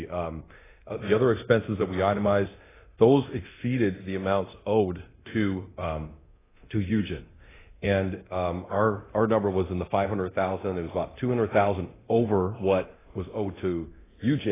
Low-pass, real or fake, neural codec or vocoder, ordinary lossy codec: 3.6 kHz; fake; codec, 24 kHz, 0.9 kbps, DualCodec; AAC, 16 kbps